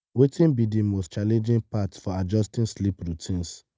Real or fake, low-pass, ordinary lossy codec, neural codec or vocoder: real; none; none; none